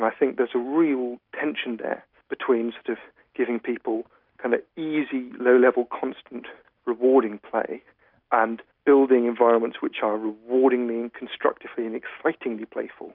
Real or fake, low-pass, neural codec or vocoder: real; 5.4 kHz; none